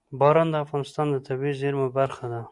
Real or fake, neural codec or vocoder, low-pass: real; none; 10.8 kHz